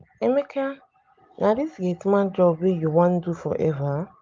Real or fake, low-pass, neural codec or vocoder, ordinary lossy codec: real; 7.2 kHz; none; Opus, 24 kbps